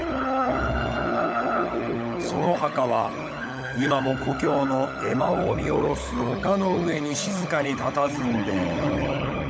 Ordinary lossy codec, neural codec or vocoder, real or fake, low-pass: none; codec, 16 kHz, 16 kbps, FunCodec, trained on LibriTTS, 50 frames a second; fake; none